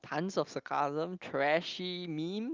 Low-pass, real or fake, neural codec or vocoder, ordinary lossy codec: 7.2 kHz; real; none; Opus, 24 kbps